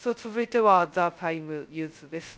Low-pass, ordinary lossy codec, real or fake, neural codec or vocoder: none; none; fake; codec, 16 kHz, 0.2 kbps, FocalCodec